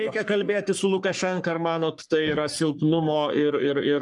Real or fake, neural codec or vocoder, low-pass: fake; codec, 44.1 kHz, 3.4 kbps, Pupu-Codec; 10.8 kHz